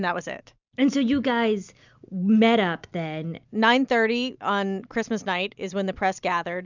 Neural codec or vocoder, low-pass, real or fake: none; 7.2 kHz; real